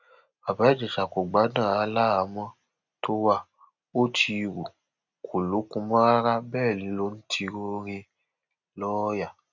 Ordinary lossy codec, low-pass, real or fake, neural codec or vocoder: none; 7.2 kHz; real; none